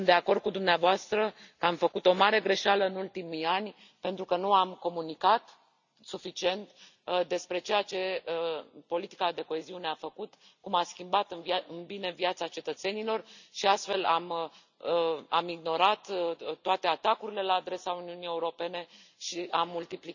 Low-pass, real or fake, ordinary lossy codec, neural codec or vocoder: 7.2 kHz; real; none; none